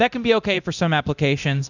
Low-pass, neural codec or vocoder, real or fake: 7.2 kHz; codec, 24 kHz, 0.9 kbps, DualCodec; fake